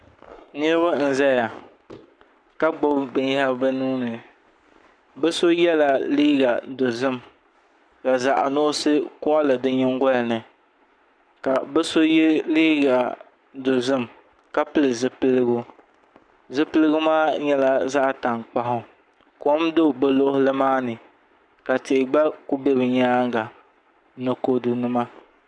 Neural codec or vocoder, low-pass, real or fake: codec, 44.1 kHz, 7.8 kbps, Pupu-Codec; 9.9 kHz; fake